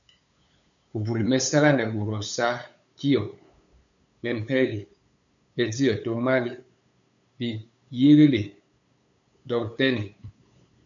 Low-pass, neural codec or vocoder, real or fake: 7.2 kHz; codec, 16 kHz, 8 kbps, FunCodec, trained on LibriTTS, 25 frames a second; fake